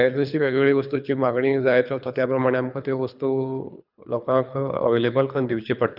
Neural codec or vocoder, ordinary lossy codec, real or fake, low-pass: codec, 24 kHz, 3 kbps, HILCodec; none; fake; 5.4 kHz